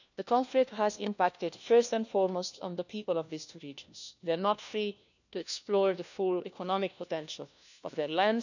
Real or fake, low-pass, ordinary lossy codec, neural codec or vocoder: fake; 7.2 kHz; none; codec, 16 kHz, 1 kbps, FunCodec, trained on LibriTTS, 50 frames a second